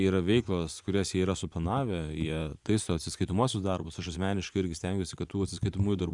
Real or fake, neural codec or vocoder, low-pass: fake; vocoder, 24 kHz, 100 mel bands, Vocos; 10.8 kHz